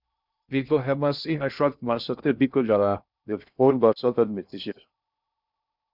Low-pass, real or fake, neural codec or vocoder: 5.4 kHz; fake; codec, 16 kHz in and 24 kHz out, 0.6 kbps, FocalCodec, streaming, 2048 codes